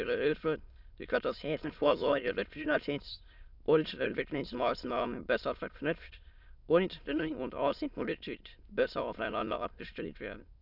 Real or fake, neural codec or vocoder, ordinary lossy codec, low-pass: fake; autoencoder, 22.05 kHz, a latent of 192 numbers a frame, VITS, trained on many speakers; none; 5.4 kHz